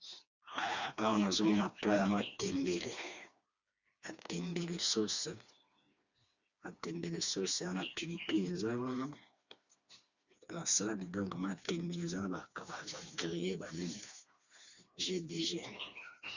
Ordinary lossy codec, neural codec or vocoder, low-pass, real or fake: Opus, 64 kbps; codec, 16 kHz, 2 kbps, FreqCodec, smaller model; 7.2 kHz; fake